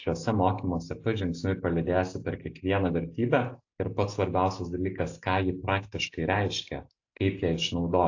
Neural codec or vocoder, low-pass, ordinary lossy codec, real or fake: none; 7.2 kHz; AAC, 48 kbps; real